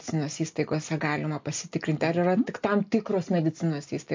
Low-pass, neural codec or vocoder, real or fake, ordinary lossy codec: 7.2 kHz; none; real; MP3, 48 kbps